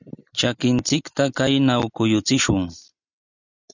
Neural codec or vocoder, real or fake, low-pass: none; real; 7.2 kHz